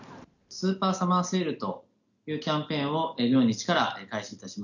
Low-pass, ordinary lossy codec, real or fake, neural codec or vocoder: 7.2 kHz; none; real; none